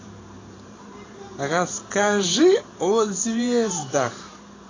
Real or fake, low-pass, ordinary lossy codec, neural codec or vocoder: fake; 7.2 kHz; AAC, 32 kbps; vocoder, 44.1 kHz, 128 mel bands every 512 samples, BigVGAN v2